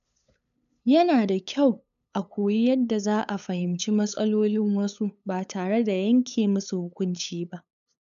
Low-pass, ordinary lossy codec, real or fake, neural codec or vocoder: 7.2 kHz; none; fake; codec, 16 kHz, 8 kbps, FunCodec, trained on LibriTTS, 25 frames a second